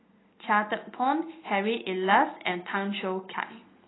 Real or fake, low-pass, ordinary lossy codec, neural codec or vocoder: real; 7.2 kHz; AAC, 16 kbps; none